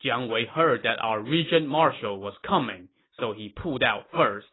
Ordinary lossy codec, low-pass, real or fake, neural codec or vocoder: AAC, 16 kbps; 7.2 kHz; real; none